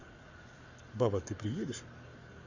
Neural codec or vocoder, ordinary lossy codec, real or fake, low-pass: codec, 44.1 kHz, 7.8 kbps, Pupu-Codec; Opus, 64 kbps; fake; 7.2 kHz